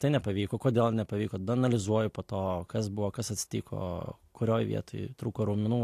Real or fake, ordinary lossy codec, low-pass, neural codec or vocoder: real; AAC, 64 kbps; 14.4 kHz; none